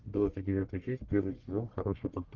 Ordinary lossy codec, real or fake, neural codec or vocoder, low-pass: Opus, 16 kbps; fake; codec, 44.1 kHz, 1.7 kbps, Pupu-Codec; 7.2 kHz